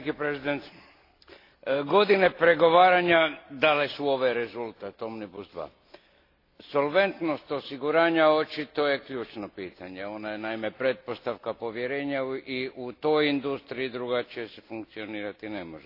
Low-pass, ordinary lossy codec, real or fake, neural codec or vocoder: 5.4 kHz; AAC, 32 kbps; real; none